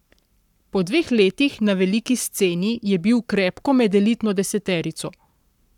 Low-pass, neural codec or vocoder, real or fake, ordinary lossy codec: 19.8 kHz; codec, 44.1 kHz, 7.8 kbps, Pupu-Codec; fake; none